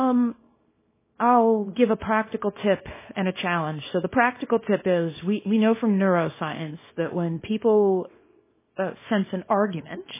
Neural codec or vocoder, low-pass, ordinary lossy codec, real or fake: codec, 24 kHz, 0.9 kbps, WavTokenizer, small release; 3.6 kHz; MP3, 16 kbps; fake